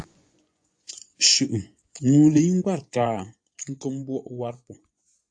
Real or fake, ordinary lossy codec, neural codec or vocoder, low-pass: fake; AAC, 64 kbps; vocoder, 24 kHz, 100 mel bands, Vocos; 9.9 kHz